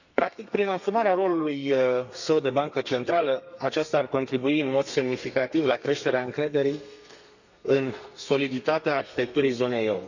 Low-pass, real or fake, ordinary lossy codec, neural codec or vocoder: 7.2 kHz; fake; none; codec, 32 kHz, 1.9 kbps, SNAC